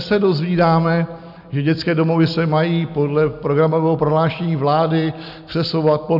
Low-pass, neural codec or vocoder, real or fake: 5.4 kHz; none; real